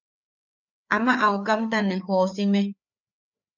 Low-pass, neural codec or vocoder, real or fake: 7.2 kHz; codec, 16 kHz, 4 kbps, FreqCodec, larger model; fake